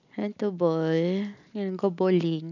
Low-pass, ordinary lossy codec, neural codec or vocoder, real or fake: 7.2 kHz; none; none; real